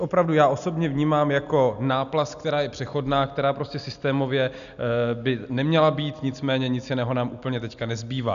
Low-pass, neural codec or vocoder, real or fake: 7.2 kHz; none; real